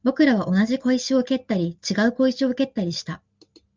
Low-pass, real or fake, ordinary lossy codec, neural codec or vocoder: 7.2 kHz; real; Opus, 24 kbps; none